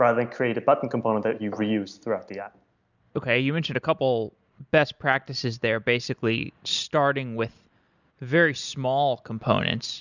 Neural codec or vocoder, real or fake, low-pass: none; real; 7.2 kHz